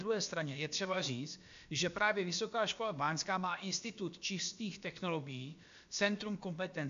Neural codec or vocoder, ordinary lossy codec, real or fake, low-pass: codec, 16 kHz, about 1 kbps, DyCAST, with the encoder's durations; AAC, 64 kbps; fake; 7.2 kHz